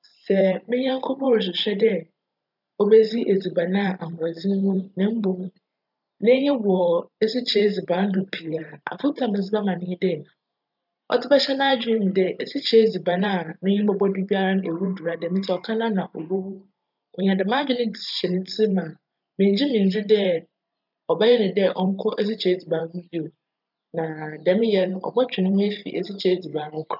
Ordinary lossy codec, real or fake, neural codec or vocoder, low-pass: none; fake; vocoder, 44.1 kHz, 128 mel bands every 512 samples, BigVGAN v2; 5.4 kHz